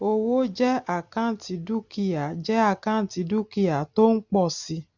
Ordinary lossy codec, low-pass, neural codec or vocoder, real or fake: none; 7.2 kHz; none; real